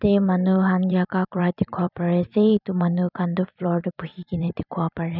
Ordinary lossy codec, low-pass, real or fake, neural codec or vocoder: none; 5.4 kHz; fake; vocoder, 44.1 kHz, 128 mel bands every 256 samples, BigVGAN v2